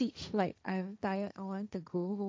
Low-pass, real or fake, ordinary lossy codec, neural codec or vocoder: none; fake; none; codec, 16 kHz, 1.1 kbps, Voila-Tokenizer